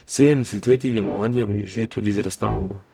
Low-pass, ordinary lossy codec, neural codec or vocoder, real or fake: 19.8 kHz; none; codec, 44.1 kHz, 0.9 kbps, DAC; fake